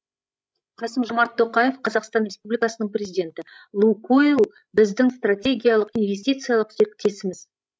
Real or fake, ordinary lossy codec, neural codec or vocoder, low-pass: fake; none; codec, 16 kHz, 16 kbps, FreqCodec, larger model; none